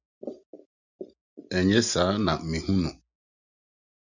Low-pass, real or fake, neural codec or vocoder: 7.2 kHz; real; none